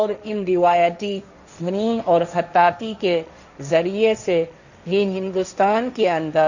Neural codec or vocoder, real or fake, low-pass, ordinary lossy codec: codec, 16 kHz, 1.1 kbps, Voila-Tokenizer; fake; 7.2 kHz; none